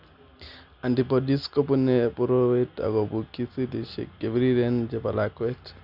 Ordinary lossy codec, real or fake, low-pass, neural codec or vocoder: none; real; 5.4 kHz; none